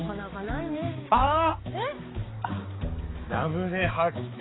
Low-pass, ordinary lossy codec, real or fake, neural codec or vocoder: 7.2 kHz; AAC, 16 kbps; fake; codec, 16 kHz, 4 kbps, X-Codec, HuBERT features, trained on balanced general audio